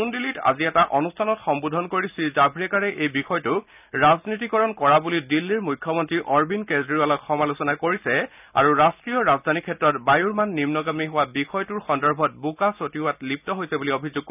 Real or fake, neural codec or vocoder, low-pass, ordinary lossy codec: real; none; 3.6 kHz; none